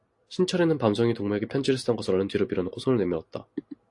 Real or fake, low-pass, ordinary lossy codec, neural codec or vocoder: real; 10.8 kHz; AAC, 48 kbps; none